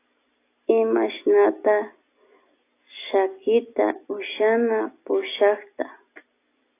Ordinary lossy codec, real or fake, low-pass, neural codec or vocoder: AAC, 24 kbps; real; 3.6 kHz; none